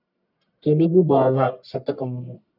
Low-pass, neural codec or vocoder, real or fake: 5.4 kHz; codec, 44.1 kHz, 1.7 kbps, Pupu-Codec; fake